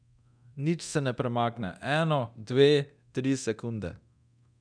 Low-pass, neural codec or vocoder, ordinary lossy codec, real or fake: 9.9 kHz; codec, 24 kHz, 0.9 kbps, DualCodec; none; fake